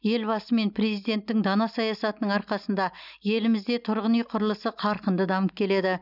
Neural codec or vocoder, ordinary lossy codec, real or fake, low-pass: none; none; real; 5.4 kHz